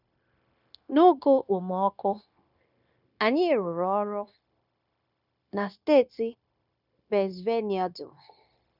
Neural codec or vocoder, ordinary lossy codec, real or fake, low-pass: codec, 16 kHz, 0.9 kbps, LongCat-Audio-Codec; none; fake; 5.4 kHz